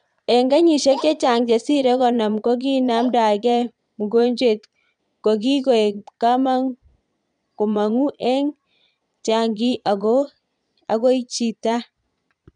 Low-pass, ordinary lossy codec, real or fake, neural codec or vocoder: 9.9 kHz; none; fake; vocoder, 22.05 kHz, 80 mel bands, Vocos